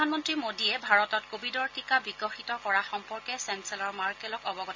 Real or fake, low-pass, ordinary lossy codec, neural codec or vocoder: real; 7.2 kHz; MP3, 48 kbps; none